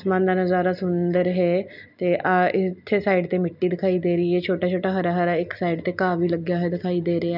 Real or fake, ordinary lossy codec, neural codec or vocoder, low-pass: real; none; none; 5.4 kHz